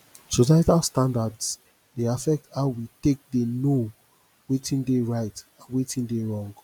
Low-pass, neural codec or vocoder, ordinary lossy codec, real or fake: 19.8 kHz; none; none; real